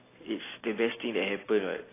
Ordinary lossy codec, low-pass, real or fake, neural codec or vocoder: AAC, 16 kbps; 3.6 kHz; real; none